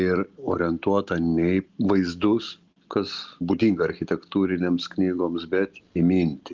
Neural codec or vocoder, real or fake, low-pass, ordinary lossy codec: none; real; 7.2 kHz; Opus, 24 kbps